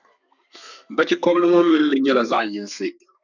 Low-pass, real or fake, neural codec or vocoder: 7.2 kHz; fake; codec, 32 kHz, 1.9 kbps, SNAC